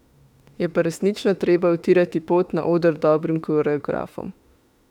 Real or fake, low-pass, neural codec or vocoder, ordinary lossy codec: fake; 19.8 kHz; autoencoder, 48 kHz, 32 numbers a frame, DAC-VAE, trained on Japanese speech; none